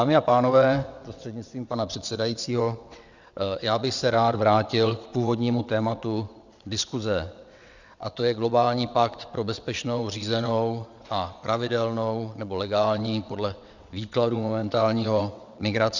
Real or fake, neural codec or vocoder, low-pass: fake; vocoder, 22.05 kHz, 80 mel bands, WaveNeXt; 7.2 kHz